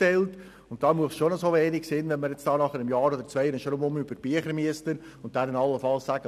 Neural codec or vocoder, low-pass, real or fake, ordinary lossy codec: none; 14.4 kHz; real; none